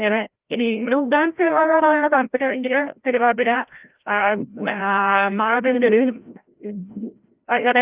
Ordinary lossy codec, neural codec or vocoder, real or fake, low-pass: Opus, 24 kbps; codec, 16 kHz, 0.5 kbps, FreqCodec, larger model; fake; 3.6 kHz